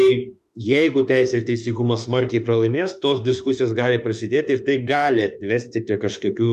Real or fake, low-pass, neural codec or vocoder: fake; 14.4 kHz; autoencoder, 48 kHz, 32 numbers a frame, DAC-VAE, trained on Japanese speech